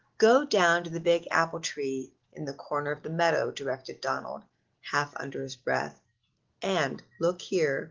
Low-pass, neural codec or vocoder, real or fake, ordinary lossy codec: 7.2 kHz; none; real; Opus, 16 kbps